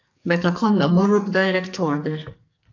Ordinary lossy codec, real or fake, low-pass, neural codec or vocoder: AAC, 48 kbps; fake; 7.2 kHz; codec, 44.1 kHz, 2.6 kbps, SNAC